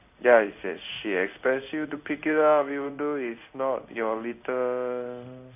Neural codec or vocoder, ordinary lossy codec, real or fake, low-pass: codec, 16 kHz in and 24 kHz out, 1 kbps, XY-Tokenizer; none; fake; 3.6 kHz